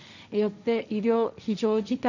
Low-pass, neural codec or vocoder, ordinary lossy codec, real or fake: 7.2 kHz; codec, 16 kHz, 1.1 kbps, Voila-Tokenizer; none; fake